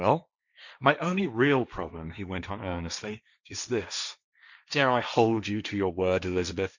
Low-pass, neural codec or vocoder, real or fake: 7.2 kHz; codec, 16 kHz, 1.1 kbps, Voila-Tokenizer; fake